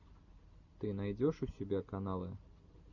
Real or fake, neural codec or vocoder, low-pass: real; none; 7.2 kHz